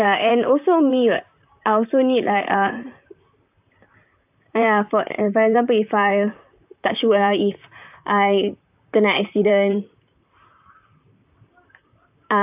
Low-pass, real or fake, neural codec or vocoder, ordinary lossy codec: 3.6 kHz; fake; vocoder, 44.1 kHz, 128 mel bands, Pupu-Vocoder; AAC, 32 kbps